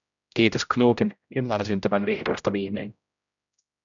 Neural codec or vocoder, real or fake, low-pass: codec, 16 kHz, 0.5 kbps, X-Codec, HuBERT features, trained on general audio; fake; 7.2 kHz